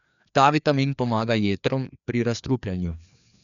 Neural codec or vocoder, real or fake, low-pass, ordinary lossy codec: codec, 16 kHz, 2 kbps, FreqCodec, larger model; fake; 7.2 kHz; none